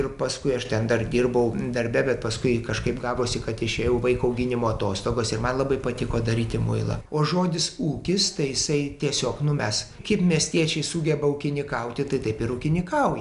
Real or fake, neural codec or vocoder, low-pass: real; none; 10.8 kHz